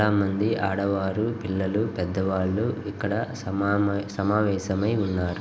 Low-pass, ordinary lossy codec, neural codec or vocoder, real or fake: none; none; none; real